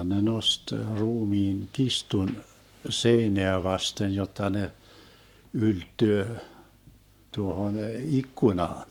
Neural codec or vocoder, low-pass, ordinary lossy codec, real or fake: codec, 44.1 kHz, 7.8 kbps, Pupu-Codec; 19.8 kHz; none; fake